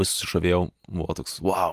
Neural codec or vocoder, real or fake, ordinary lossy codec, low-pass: none; real; Opus, 32 kbps; 14.4 kHz